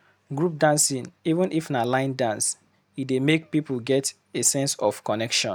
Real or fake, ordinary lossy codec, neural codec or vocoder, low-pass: real; none; none; none